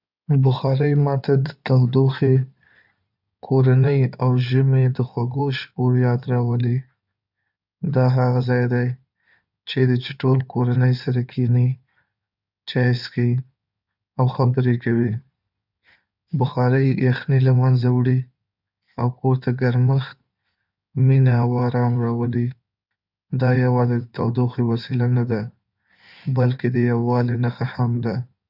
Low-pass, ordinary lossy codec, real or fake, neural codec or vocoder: 5.4 kHz; none; fake; codec, 16 kHz in and 24 kHz out, 2.2 kbps, FireRedTTS-2 codec